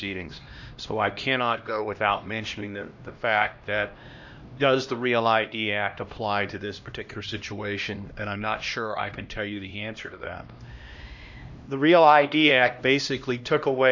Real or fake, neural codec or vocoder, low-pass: fake; codec, 16 kHz, 1 kbps, X-Codec, HuBERT features, trained on LibriSpeech; 7.2 kHz